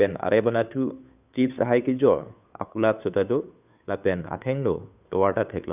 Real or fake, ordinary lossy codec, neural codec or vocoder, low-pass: fake; none; codec, 16 kHz, 2 kbps, FunCodec, trained on Chinese and English, 25 frames a second; 3.6 kHz